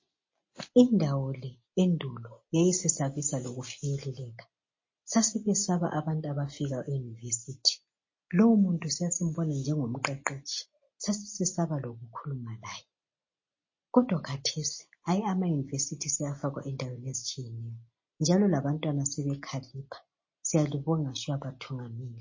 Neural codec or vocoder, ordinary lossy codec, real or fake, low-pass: none; MP3, 32 kbps; real; 7.2 kHz